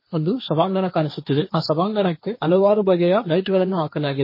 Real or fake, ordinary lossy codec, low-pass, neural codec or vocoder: fake; MP3, 24 kbps; 5.4 kHz; codec, 16 kHz, 1.1 kbps, Voila-Tokenizer